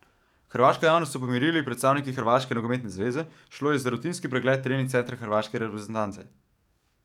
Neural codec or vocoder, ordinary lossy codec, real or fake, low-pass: codec, 44.1 kHz, 7.8 kbps, DAC; none; fake; 19.8 kHz